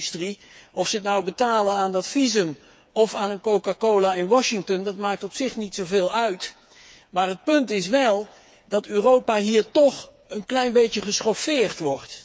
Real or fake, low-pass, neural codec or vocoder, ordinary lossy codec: fake; none; codec, 16 kHz, 4 kbps, FreqCodec, smaller model; none